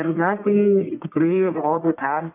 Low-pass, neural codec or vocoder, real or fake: 3.6 kHz; codec, 44.1 kHz, 1.7 kbps, Pupu-Codec; fake